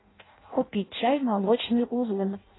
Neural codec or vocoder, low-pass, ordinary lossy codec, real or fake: codec, 16 kHz in and 24 kHz out, 0.6 kbps, FireRedTTS-2 codec; 7.2 kHz; AAC, 16 kbps; fake